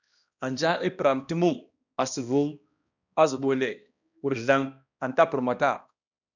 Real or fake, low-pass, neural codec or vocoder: fake; 7.2 kHz; codec, 16 kHz, 1 kbps, X-Codec, HuBERT features, trained on balanced general audio